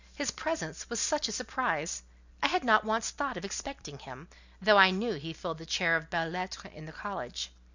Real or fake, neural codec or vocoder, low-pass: real; none; 7.2 kHz